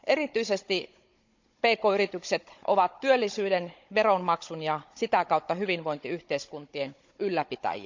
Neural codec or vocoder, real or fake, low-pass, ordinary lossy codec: codec, 16 kHz, 16 kbps, FunCodec, trained on Chinese and English, 50 frames a second; fake; 7.2 kHz; MP3, 64 kbps